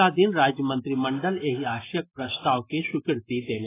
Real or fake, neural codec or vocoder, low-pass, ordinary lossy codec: real; none; 3.6 kHz; AAC, 16 kbps